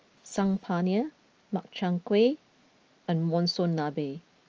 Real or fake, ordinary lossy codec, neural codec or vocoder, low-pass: real; Opus, 24 kbps; none; 7.2 kHz